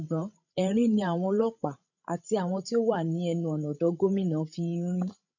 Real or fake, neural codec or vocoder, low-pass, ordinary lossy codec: fake; codec, 16 kHz, 16 kbps, FreqCodec, larger model; 7.2 kHz; none